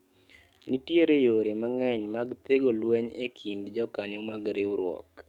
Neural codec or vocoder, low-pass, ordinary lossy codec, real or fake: codec, 44.1 kHz, 7.8 kbps, DAC; 19.8 kHz; none; fake